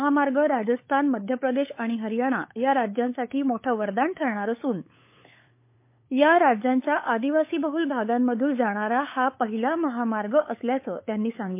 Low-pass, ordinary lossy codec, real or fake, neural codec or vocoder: 3.6 kHz; MP3, 24 kbps; fake; codec, 16 kHz, 8 kbps, FunCodec, trained on LibriTTS, 25 frames a second